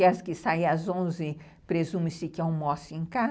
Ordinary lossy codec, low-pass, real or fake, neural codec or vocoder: none; none; real; none